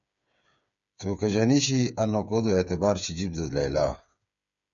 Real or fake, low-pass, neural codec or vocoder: fake; 7.2 kHz; codec, 16 kHz, 8 kbps, FreqCodec, smaller model